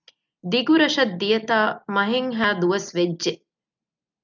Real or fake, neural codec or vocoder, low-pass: real; none; 7.2 kHz